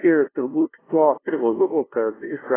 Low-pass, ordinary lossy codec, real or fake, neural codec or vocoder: 3.6 kHz; AAC, 16 kbps; fake; codec, 16 kHz, 0.5 kbps, FunCodec, trained on LibriTTS, 25 frames a second